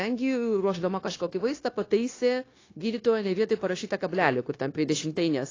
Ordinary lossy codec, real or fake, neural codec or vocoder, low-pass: AAC, 32 kbps; fake; codec, 16 kHz, 0.9 kbps, LongCat-Audio-Codec; 7.2 kHz